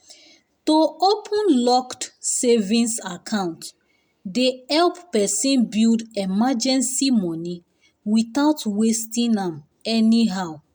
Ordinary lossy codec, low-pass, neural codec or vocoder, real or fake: none; none; none; real